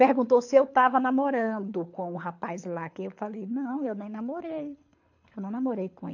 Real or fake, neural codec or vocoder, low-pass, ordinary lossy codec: fake; codec, 24 kHz, 6 kbps, HILCodec; 7.2 kHz; AAC, 48 kbps